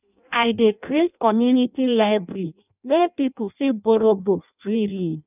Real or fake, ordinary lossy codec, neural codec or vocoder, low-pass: fake; none; codec, 16 kHz in and 24 kHz out, 0.6 kbps, FireRedTTS-2 codec; 3.6 kHz